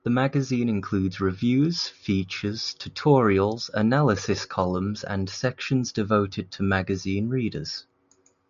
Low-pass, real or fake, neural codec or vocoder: 7.2 kHz; real; none